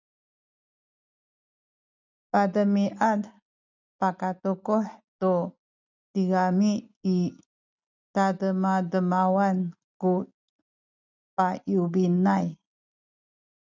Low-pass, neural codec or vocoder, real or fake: 7.2 kHz; none; real